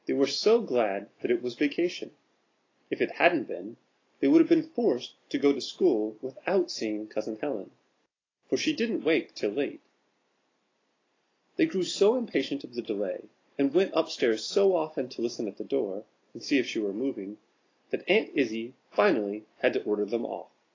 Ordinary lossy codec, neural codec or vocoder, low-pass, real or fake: AAC, 32 kbps; none; 7.2 kHz; real